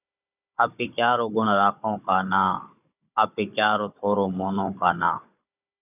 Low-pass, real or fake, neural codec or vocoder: 3.6 kHz; fake; codec, 16 kHz, 16 kbps, FunCodec, trained on Chinese and English, 50 frames a second